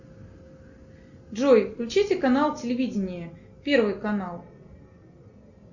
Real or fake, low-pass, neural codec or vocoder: real; 7.2 kHz; none